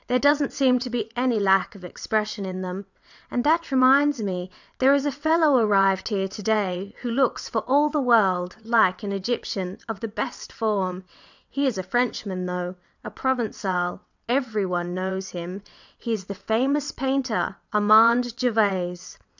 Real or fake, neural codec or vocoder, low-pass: fake; vocoder, 22.05 kHz, 80 mel bands, WaveNeXt; 7.2 kHz